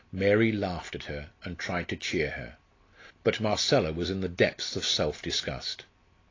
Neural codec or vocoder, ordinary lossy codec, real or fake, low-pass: none; AAC, 32 kbps; real; 7.2 kHz